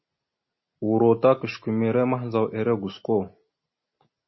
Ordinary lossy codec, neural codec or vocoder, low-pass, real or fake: MP3, 24 kbps; none; 7.2 kHz; real